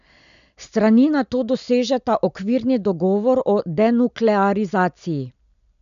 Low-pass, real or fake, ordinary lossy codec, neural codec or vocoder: 7.2 kHz; real; none; none